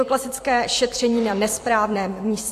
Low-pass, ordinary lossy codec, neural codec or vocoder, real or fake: 14.4 kHz; AAC, 64 kbps; vocoder, 44.1 kHz, 128 mel bands every 256 samples, BigVGAN v2; fake